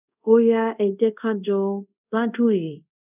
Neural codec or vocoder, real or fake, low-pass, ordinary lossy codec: codec, 24 kHz, 0.5 kbps, DualCodec; fake; 3.6 kHz; none